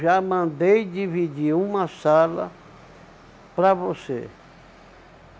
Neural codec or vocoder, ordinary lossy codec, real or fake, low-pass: none; none; real; none